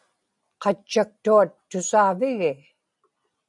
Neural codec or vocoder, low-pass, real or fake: none; 10.8 kHz; real